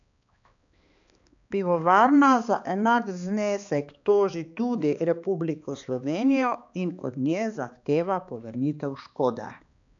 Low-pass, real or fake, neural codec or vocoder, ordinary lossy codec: 7.2 kHz; fake; codec, 16 kHz, 2 kbps, X-Codec, HuBERT features, trained on balanced general audio; none